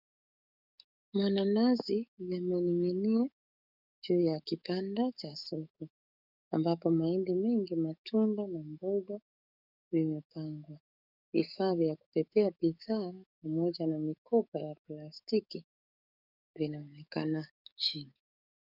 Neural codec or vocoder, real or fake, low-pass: codec, 44.1 kHz, 7.8 kbps, DAC; fake; 5.4 kHz